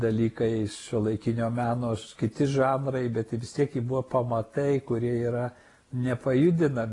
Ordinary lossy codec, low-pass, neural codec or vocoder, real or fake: AAC, 32 kbps; 10.8 kHz; none; real